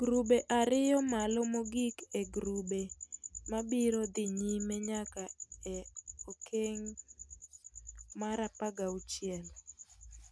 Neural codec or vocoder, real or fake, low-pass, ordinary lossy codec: none; real; none; none